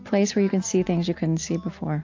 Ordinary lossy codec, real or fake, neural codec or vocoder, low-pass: AAC, 48 kbps; real; none; 7.2 kHz